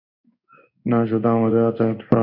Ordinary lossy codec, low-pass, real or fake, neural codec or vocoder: MP3, 48 kbps; 5.4 kHz; fake; codec, 16 kHz in and 24 kHz out, 1 kbps, XY-Tokenizer